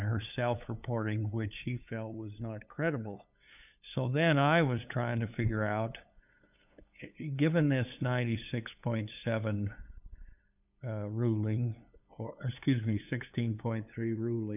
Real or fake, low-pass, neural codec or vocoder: fake; 3.6 kHz; codec, 16 kHz, 8 kbps, FunCodec, trained on LibriTTS, 25 frames a second